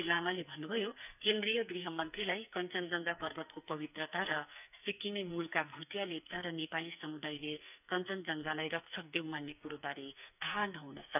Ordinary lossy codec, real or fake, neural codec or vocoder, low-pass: none; fake; codec, 44.1 kHz, 2.6 kbps, SNAC; 3.6 kHz